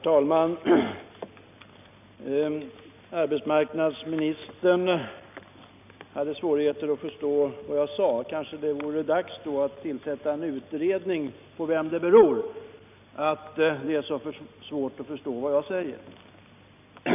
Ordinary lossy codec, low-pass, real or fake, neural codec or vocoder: none; 3.6 kHz; real; none